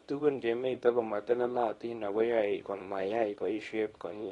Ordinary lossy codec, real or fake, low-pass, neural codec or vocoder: AAC, 32 kbps; fake; 10.8 kHz; codec, 24 kHz, 0.9 kbps, WavTokenizer, small release